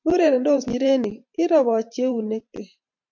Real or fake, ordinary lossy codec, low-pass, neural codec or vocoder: fake; MP3, 64 kbps; 7.2 kHz; vocoder, 44.1 kHz, 128 mel bands every 512 samples, BigVGAN v2